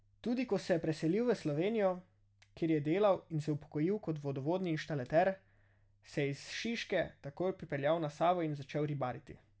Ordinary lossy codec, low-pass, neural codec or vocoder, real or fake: none; none; none; real